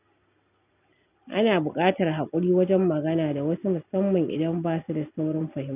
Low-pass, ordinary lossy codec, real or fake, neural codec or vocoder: 3.6 kHz; none; real; none